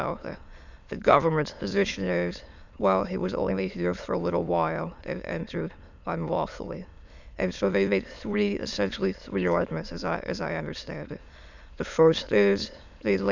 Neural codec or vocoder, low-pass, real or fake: autoencoder, 22.05 kHz, a latent of 192 numbers a frame, VITS, trained on many speakers; 7.2 kHz; fake